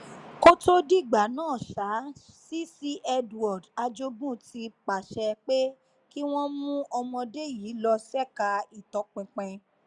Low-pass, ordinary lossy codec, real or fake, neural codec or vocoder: 10.8 kHz; none; real; none